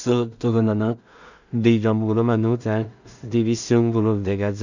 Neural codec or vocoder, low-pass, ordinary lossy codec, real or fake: codec, 16 kHz in and 24 kHz out, 0.4 kbps, LongCat-Audio-Codec, two codebook decoder; 7.2 kHz; none; fake